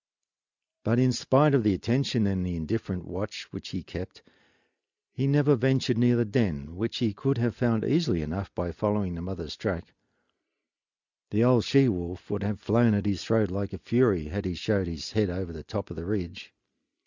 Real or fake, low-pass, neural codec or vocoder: real; 7.2 kHz; none